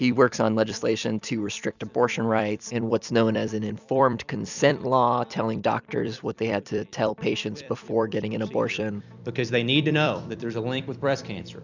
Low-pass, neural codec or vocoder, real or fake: 7.2 kHz; vocoder, 44.1 kHz, 128 mel bands every 256 samples, BigVGAN v2; fake